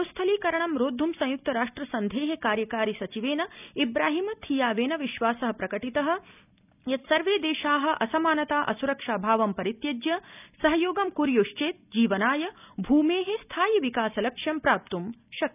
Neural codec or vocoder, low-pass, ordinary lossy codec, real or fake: none; 3.6 kHz; none; real